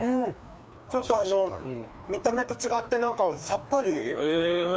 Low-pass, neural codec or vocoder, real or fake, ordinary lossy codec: none; codec, 16 kHz, 2 kbps, FreqCodec, larger model; fake; none